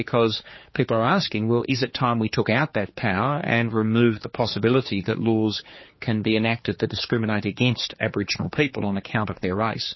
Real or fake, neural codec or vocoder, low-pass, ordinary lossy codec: fake; codec, 16 kHz, 4 kbps, X-Codec, HuBERT features, trained on general audio; 7.2 kHz; MP3, 24 kbps